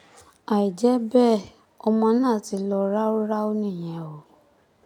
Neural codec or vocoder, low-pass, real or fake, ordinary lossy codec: none; 19.8 kHz; real; none